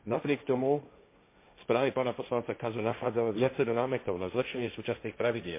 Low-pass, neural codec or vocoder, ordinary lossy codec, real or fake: 3.6 kHz; codec, 16 kHz, 1.1 kbps, Voila-Tokenizer; MP3, 24 kbps; fake